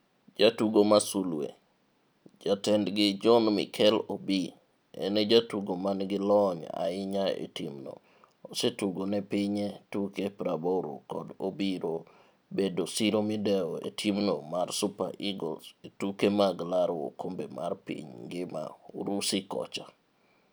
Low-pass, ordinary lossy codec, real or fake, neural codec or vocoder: none; none; real; none